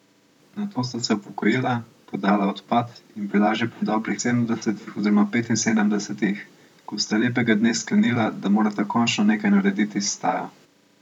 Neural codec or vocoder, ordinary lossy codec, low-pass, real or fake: vocoder, 44.1 kHz, 128 mel bands, Pupu-Vocoder; none; 19.8 kHz; fake